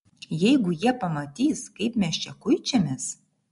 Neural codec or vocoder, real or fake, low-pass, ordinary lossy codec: none; real; 10.8 kHz; MP3, 64 kbps